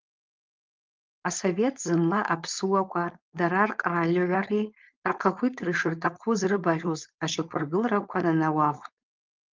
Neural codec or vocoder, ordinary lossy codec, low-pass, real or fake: codec, 16 kHz, 4.8 kbps, FACodec; Opus, 24 kbps; 7.2 kHz; fake